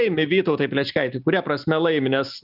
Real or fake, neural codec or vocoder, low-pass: real; none; 5.4 kHz